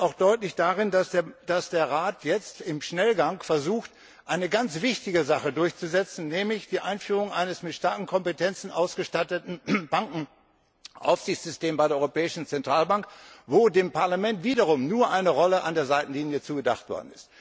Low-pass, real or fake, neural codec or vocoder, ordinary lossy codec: none; real; none; none